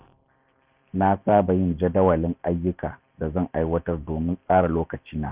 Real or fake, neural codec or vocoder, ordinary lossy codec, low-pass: fake; vocoder, 44.1 kHz, 128 mel bands every 512 samples, BigVGAN v2; Opus, 64 kbps; 3.6 kHz